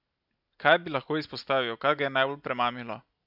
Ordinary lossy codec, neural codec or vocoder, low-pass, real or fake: none; none; 5.4 kHz; real